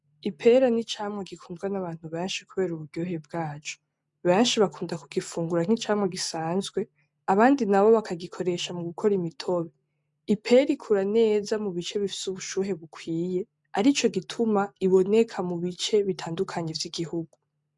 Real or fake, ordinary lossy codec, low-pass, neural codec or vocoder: real; MP3, 96 kbps; 10.8 kHz; none